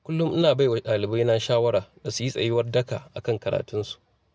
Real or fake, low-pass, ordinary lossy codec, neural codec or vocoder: real; none; none; none